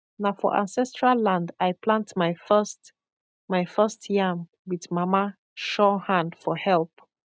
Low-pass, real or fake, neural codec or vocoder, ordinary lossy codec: none; real; none; none